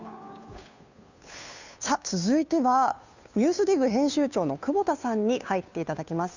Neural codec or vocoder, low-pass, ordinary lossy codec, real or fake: codec, 16 kHz, 2 kbps, FunCodec, trained on Chinese and English, 25 frames a second; 7.2 kHz; none; fake